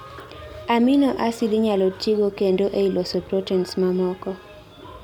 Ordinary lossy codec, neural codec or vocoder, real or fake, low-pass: MP3, 96 kbps; none; real; 19.8 kHz